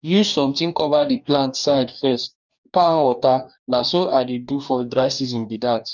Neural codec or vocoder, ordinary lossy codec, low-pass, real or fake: codec, 44.1 kHz, 2.6 kbps, DAC; none; 7.2 kHz; fake